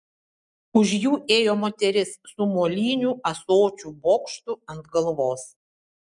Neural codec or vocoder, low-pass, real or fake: none; 10.8 kHz; real